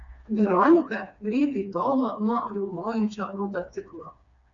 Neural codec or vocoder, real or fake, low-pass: codec, 16 kHz, 2 kbps, FreqCodec, smaller model; fake; 7.2 kHz